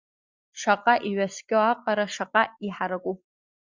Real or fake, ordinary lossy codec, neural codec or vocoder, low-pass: real; Opus, 64 kbps; none; 7.2 kHz